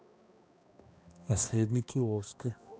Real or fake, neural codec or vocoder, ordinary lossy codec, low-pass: fake; codec, 16 kHz, 1 kbps, X-Codec, HuBERT features, trained on balanced general audio; none; none